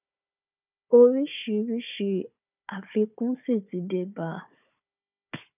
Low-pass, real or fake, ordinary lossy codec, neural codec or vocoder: 3.6 kHz; fake; none; codec, 16 kHz, 4 kbps, FunCodec, trained on Chinese and English, 50 frames a second